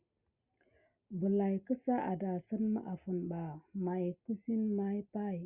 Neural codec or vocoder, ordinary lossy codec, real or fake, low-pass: none; Opus, 64 kbps; real; 3.6 kHz